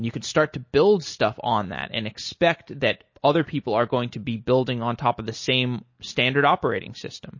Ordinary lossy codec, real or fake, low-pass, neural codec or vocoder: MP3, 32 kbps; real; 7.2 kHz; none